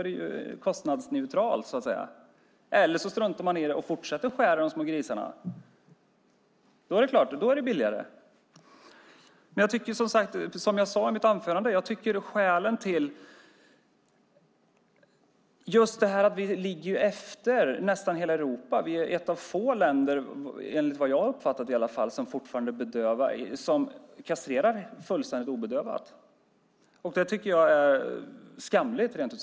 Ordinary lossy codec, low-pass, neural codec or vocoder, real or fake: none; none; none; real